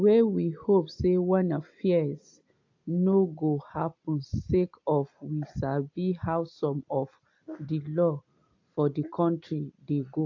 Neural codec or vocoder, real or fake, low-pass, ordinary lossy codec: none; real; 7.2 kHz; AAC, 48 kbps